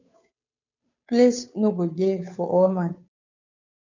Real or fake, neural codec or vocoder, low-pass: fake; codec, 16 kHz, 2 kbps, FunCodec, trained on Chinese and English, 25 frames a second; 7.2 kHz